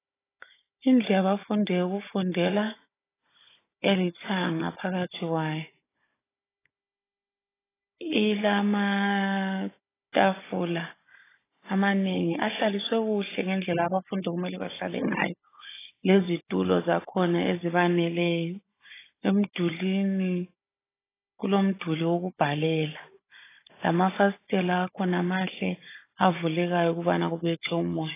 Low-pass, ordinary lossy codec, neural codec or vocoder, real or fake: 3.6 kHz; AAC, 16 kbps; codec, 16 kHz, 16 kbps, FunCodec, trained on Chinese and English, 50 frames a second; fake